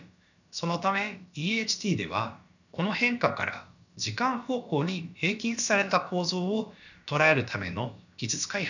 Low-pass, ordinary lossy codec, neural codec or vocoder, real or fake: 7.2 kHz; none; codec, 16 kHz, about 1 kbps, DyCAST, with the encoder's durations; fake